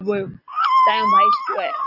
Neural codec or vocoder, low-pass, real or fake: none; 5.4 kHz; real